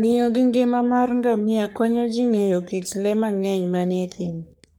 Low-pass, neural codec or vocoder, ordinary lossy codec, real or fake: none; codec, 44.1 kHz, 3.4 kbps, Pupu-Codec; none; fake